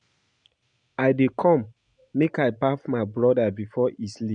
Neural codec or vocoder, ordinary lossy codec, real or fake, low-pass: none; none; real; 9.9 kHz